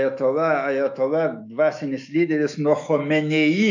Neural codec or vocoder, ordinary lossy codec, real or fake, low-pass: autoencoder, 48 kHz, 128 numbers a frame, DAC-VAE, trained on Japanese speech; AAC, 48 kbps; fake; 7.2 kHz